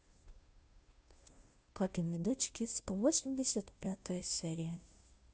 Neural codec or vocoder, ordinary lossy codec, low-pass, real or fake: codec, 16 kHz, 0.5 kbps, FunCodec, trained on Chinese and English, 25 frames a second; none; none; fake